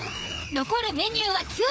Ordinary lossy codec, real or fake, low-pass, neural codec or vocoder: none; fake; none; codec, 16 kHz, 4 kbps, FreqCodec, larger model